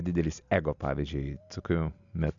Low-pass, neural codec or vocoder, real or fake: 7.2 kHz; none; real